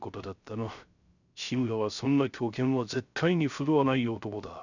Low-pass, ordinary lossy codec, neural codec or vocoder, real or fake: 7.2 kHz; none; codec, 16 kHz, 0.3 kbps, FocalCodec; fake